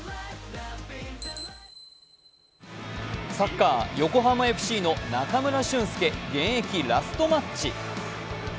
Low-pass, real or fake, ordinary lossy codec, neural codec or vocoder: none; real; none; none